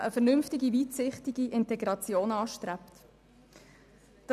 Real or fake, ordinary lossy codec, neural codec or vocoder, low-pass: real; none; none; 14.4 kHz